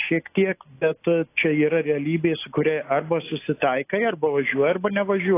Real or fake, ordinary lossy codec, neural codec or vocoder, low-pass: real; AAC, 24 kbps; none; 3.6 kHz